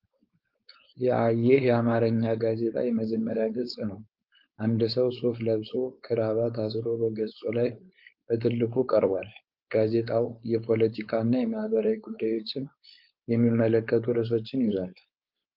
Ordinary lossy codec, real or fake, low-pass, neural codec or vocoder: Opus, 32 kbps; fake; 5.4 kHz; codec, 16 kHz, 4.8 kbps, FACodec